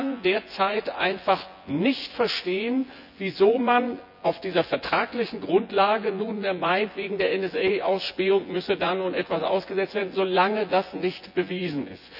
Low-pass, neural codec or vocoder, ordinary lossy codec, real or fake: 5.4 kHz; vocoder, 24 kHz, 100 mel bands, Vocos; none; fake